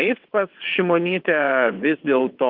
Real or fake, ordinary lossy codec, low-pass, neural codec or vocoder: fake; Opus, 24 kbps; 5.4 kHz; vocoder, 22.05 kHz, 80 mel bands, WaveNeXt